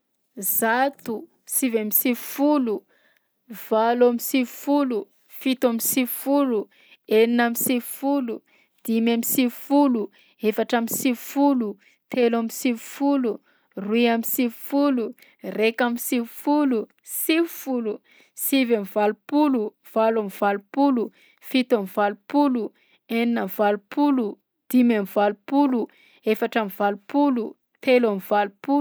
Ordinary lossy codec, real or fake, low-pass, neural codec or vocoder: none; real; none; none